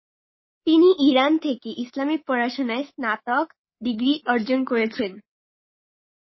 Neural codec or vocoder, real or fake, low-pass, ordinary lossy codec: codec, 24 kHz, 3.1 kbps, DualCodec; fake; 7.2 kHz; MP3, 24 kbps